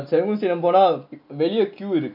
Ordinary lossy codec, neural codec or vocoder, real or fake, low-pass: none; none; real; 5.4 kHz